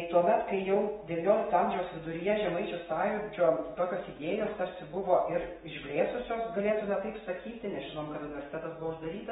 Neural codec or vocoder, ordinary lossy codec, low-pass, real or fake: autoencoder, 48 kHz, 128 numbers a frame, DAC-VAE, trained on Japanese speech; AAC, 16 kbps; 19.8 kHz; fake